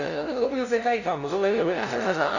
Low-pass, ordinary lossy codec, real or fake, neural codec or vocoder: 7.2 kHz; none; fake; codec, 16 kHz, 0.5 kbps, FunCodec, trained on LibriTTS, 25 frames a second